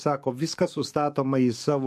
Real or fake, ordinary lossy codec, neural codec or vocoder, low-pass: fake; MP3, 64 kbps; codec, 44.1 kHz, 7.8 kbps, DAC; 14.4 kHz